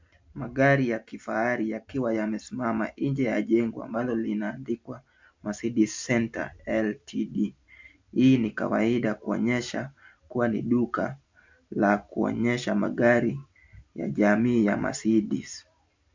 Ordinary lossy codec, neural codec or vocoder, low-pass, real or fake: MP3, 64 kbps; none; 7.2 kHz; real